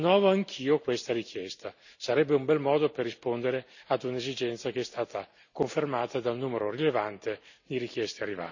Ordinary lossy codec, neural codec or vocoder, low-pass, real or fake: none; none; 7.2 kHz; real